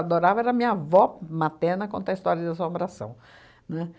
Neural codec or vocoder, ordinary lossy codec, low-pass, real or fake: none; none; none; real